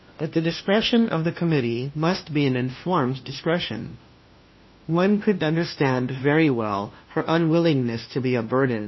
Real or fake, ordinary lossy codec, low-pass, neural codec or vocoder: fake; MP3, 24 kbps; 7.2 kHz; codec, 16 kHz, 1 kbps, FunCodec, trained on LibriTTS, 50 frames a second